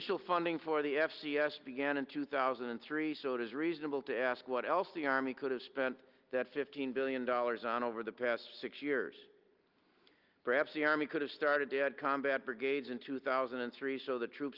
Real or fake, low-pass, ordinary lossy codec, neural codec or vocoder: real; 5.4 kHz; Opus, 32 kbps; none